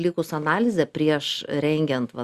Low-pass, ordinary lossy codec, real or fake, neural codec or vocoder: 14.4 kHz; Opus, 64 kbps; real; none